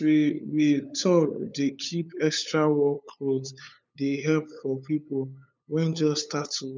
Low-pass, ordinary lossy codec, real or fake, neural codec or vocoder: none; none; fake; codec, 16 kHz, 8 kbps, FunCodec, trained on LibriTTS, 25 frames a second